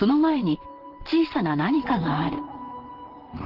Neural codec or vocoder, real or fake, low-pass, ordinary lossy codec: vocoder, 44.1 kHz, 128 mel bands, Pupu-Vocoder; fake; 5.4 kHz; Opus, 16 kbps